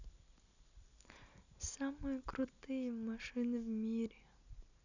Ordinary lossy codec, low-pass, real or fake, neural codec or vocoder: none; 7.2 kHz; real; none